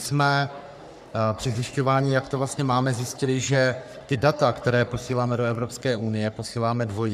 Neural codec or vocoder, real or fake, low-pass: codec, 44.1 kHz, 3.4 kbps, Pupu-Codec; fake; 14.4 kHz